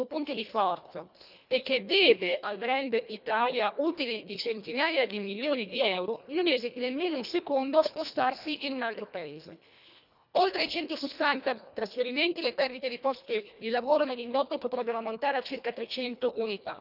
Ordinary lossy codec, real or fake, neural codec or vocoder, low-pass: none; fake; codec, 24 kHz, 1.5 kbps, HILCodec; 5.4 kHz